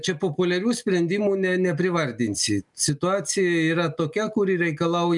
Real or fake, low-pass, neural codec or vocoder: real; 10.8 kHz; none